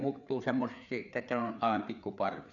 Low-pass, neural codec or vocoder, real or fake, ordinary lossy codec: 7.2 kHz; codec, 16 kHz, 4 kbps, FreqCodec, larger model; fake; none